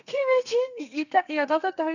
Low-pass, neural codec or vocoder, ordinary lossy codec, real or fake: 7.2 kHz; codec, 32 kHz, 1.9 kbps, SNAC; none; fake